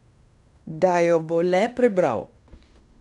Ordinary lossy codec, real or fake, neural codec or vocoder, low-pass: none; fake; codec, 16 kHz in and 24 kHz out, 0.9 kbps, LongCat-Audio-Codec, fine tuned four codebook decoder; 10.8 kHz